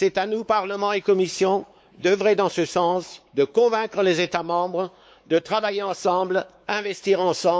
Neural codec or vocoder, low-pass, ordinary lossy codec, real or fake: codec, 16 kHz, 4 kbps, X-Codec, WavLM features, trained on Multilingual LibriSpeech; none; none; fake